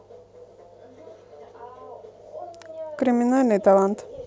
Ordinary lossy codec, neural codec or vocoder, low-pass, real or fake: none; none; none; real